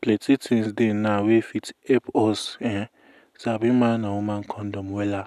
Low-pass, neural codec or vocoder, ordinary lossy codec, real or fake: 14.4 kHz; none; none; real